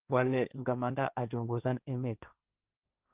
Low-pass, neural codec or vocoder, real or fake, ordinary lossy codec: 3.6 kHz; codec, 16 kHz, 1.1 kbps, Voila-Tokenizer; fake; Opus, 24 kbps